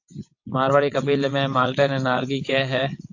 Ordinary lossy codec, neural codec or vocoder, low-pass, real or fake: AAC, 48 kbps; vocoder, 22.05 kHz, 80 mel bands, WaveNeXt; 7.2 kHz; fake